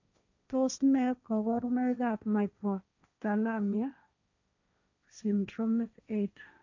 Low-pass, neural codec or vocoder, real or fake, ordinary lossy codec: 7.2 kHz; codec, 16 kHz, 1.1 kbps, Voila-Tokenizer; fake; none